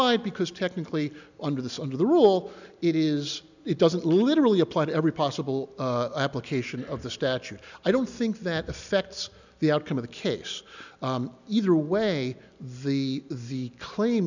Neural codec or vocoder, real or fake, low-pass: none; real; 7.2 kHz